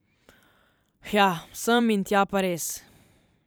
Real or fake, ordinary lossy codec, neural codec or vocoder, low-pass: fake; none; vocoder, 44.1 kHz, 128 mel bands every 512 samples, BigVGAN v2; none